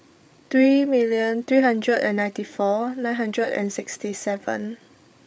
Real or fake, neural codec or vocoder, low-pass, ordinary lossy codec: fake; codec, 16 kHz, 8 kbps, FreqCodec, larger model; none; none